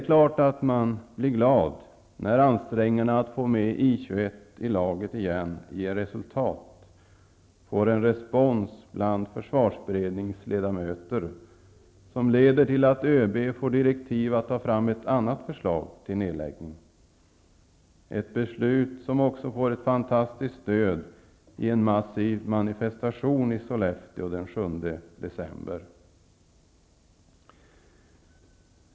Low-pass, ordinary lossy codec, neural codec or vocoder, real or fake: none; none; none; real